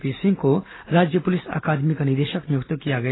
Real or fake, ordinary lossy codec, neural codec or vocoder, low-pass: real; AAC, 16 kbps; none; 7.2 kHz